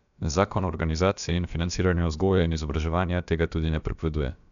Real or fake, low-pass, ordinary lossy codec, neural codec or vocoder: fake; 7.2 kHz; none; codec, 16 kHz, about 1 kbps, DyCAST, with the encoder's durations